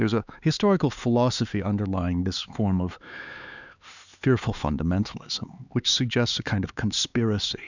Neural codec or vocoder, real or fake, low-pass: codec, 16 kHz, 4 kbps, X-Codec, HuBERT features, trained on LibriSpeech; fake; 7.2 kHz